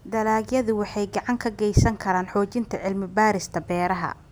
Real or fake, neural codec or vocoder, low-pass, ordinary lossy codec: real; none; none; none